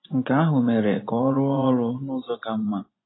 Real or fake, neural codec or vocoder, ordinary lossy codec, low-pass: fake; vocoder, 24 kHz, 100 mel bands, Vocos; AAC, 16 kbps; 7.2 kHz